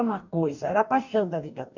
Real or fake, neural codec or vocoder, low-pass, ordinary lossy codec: fake; codec, 44.1 kHz, 2.6 kbps, DAC; 7.2 kHz; none